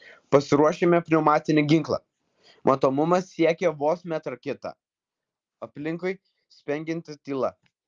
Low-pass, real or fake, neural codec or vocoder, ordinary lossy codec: 7.2 kHz; real; none; Opus, 32 kbps